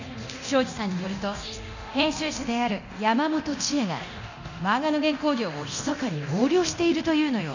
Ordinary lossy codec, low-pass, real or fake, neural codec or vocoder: none; 7.2 kHz; fake; codec, 24 kHz, 0.9 kbps, DualCodec